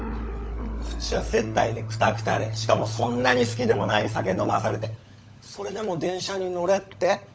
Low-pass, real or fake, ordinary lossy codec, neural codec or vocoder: none; fake; none; codec, 16 kHz, 16 kbps, FunCodec, trained on LibriTTS, 50 frames a second